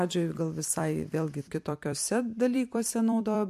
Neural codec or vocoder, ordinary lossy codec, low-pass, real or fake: vocoder, 44.1 kHz, 128 mel bands every 256 samples, BigVGAN v2; MP3, 64 kbps; 14.4 kHz; fake